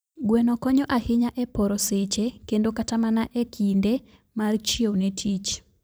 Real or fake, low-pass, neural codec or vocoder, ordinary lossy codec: fake; none; vocoder, 44.1 kHz, 128 mel bands every 512 samples, BigVGAN v2; none